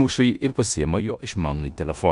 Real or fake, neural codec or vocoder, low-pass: fake; codec, 16 kHz in and 24 kHz out, 0.9 kbps, LongCat-Audio-Codec, four codebook decoder; 10.8 kHz